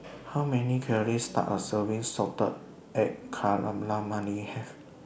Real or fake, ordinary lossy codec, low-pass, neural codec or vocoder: real; none; none; none